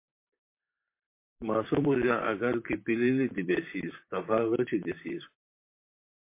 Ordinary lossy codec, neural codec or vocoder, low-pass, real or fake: MP3, 24 kbps; vocoder, 44.1 kHz, 128 mel bands, Pupu-Vocoder; 3.6 kHz; fake